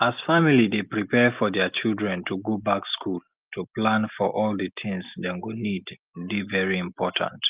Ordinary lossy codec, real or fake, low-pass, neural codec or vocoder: Opus, 32 kbps; real; 3.6 kHz; none